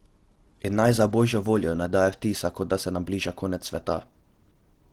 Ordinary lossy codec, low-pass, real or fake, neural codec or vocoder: Opus, 16 kbps; 19.8 kHz; real; none